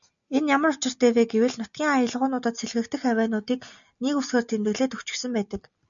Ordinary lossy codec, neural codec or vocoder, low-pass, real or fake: MP3, 96 kbps; none; 7.2 kHz; real